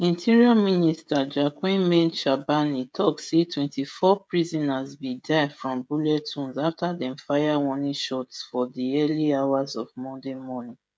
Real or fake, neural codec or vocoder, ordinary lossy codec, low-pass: fake; codec, 16 kHz, 16 kbps, FreqCodec, smaller model; none; none